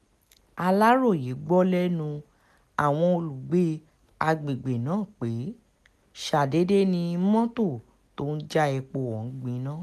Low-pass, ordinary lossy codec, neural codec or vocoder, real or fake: 14.4 kHz; none; none; real